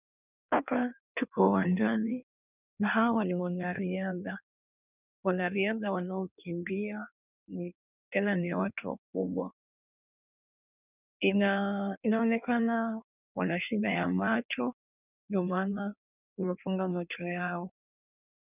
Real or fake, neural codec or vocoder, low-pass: fake; codec, 16 kHz in and 24 kHz out, 1.1 kbps, FireRedTTS-2 codec; 3.6 kHz